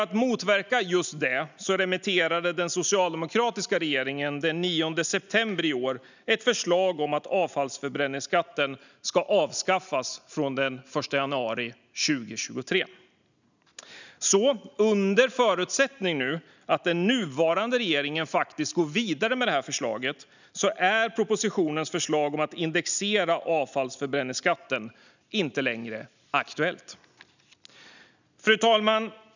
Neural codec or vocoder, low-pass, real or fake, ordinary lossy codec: none; 7.2 kHz; real; none